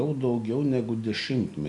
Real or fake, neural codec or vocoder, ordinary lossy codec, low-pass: real; none; MP3, 96 kbps; 10.8 kHz